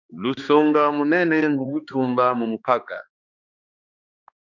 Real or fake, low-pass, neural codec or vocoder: fake; 7.2 kHz; codec, 16 kHz, 2 kbps, X-Codec, HuBERT features, trained on balanced general audio